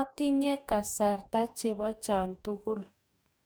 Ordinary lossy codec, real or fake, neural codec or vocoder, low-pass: none; fake; codec, 44.1 kHz, 2.6 kbps, DAC; none